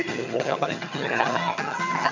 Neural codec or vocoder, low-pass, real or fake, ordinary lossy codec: vocoder, 22.05 kHz, 80 mel bands, HiFi-GAN; 7.2 kHz; fake; none